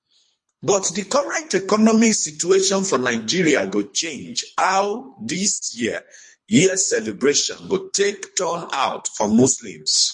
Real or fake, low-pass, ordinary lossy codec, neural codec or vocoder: fake; 10.8 kHz; MP3, 48 kbps; codec, 24 kHz, 3 kbps, HILCodec